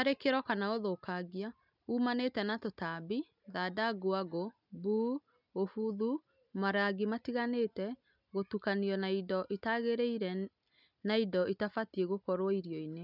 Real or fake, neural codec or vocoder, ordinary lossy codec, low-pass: real; none; none; 5.4 kHz